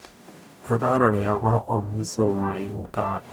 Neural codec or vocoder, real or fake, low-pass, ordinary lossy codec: codec, 44.1 kHz, 0.9 kbps, DAC; fake; none; none